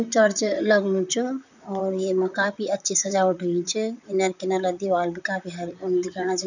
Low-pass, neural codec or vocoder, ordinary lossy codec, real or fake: 7.2 kHz; vocoder, 44.1 kHz, 128 mel bands, Pupu-Vocoder; none; fake